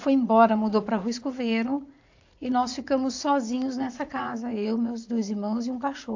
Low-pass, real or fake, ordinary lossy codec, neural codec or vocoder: 7.2 kHz; fake; AAC, 48 kbps; vocoder, 44.1 kHz, 80 mel bands, Vocos